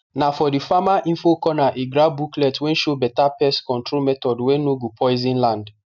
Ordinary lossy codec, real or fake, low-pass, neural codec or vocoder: none; real; 7.2 kHz; none